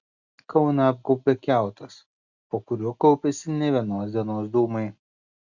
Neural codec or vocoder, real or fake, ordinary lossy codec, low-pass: none; real; Opus, 64 kbps; 7.2 kHz